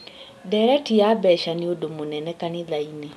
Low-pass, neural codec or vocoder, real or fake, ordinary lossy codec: none; none; real; none